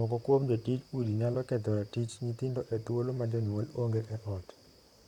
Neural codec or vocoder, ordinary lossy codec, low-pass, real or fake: vocoder, 44.1 kHz, 128 mel bands, Pupu-Vocoder; none; 19.8 kHz; fake